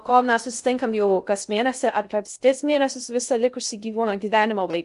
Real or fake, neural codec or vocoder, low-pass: fake; codec, 16 kHz in and 24 kHz out, 0.6 kbps, FocalCodec, streaming, 2048 codes; 10.8 kHz